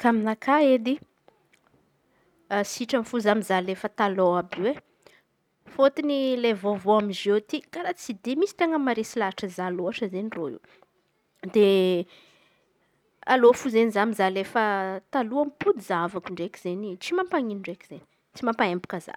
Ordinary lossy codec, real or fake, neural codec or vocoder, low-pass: none; real; none; 19.8 kHz